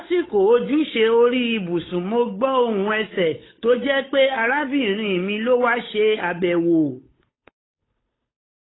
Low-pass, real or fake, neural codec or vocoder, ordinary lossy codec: 7.2 kHz; fake; codec, 16 kHz, 8 kbps, FunCodec, trained on Chinese and English, 25 frames a second; AAC, 16 kbps